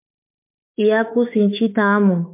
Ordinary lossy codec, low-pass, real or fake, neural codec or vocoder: MP3, 24 kbps; 3.6 kHz; fake; autoencoder, 48 kHz, 32 numbers a frame, DAC-VAE, trained on Japanese speech